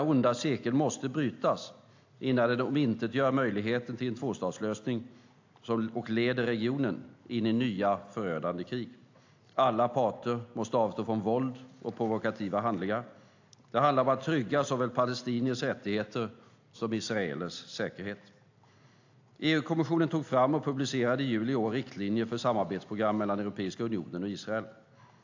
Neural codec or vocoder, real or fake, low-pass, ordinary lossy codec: none; real; 7.2 kHz; AAC, 48 kbps